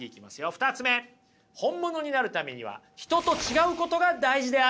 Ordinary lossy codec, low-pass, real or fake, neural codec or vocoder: none; none; real; none